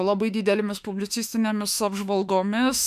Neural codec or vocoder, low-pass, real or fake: autoencoder, 48 kHz, 32 numbers a frame, DAC-VAE, trained on Japanese speech; 14.4 kHz; fake